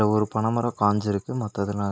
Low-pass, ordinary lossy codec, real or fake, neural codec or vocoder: none; none; real; none